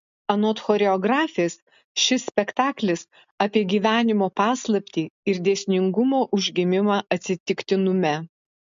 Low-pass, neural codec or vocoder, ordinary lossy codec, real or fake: 7.2 kHz; none; MP3, 48 kbps; real